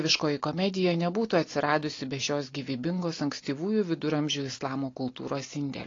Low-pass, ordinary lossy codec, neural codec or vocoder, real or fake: 7.2 kHz; AAC, 32 kbps; none; real